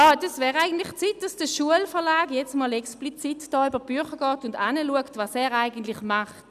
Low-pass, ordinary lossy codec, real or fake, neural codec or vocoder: 14.4 kHz; none; real; none